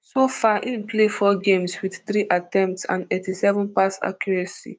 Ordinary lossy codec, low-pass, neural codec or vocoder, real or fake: none; none; codec, 16 kHz, 6 kbps, DAC; fake